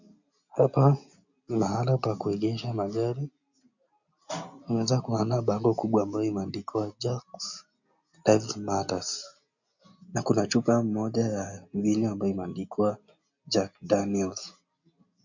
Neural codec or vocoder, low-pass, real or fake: none; 7.2 kHz; real